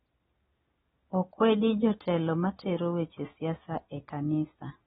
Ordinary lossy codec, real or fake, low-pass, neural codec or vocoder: AAC, 16 kbps; real; 19.8 kHz; none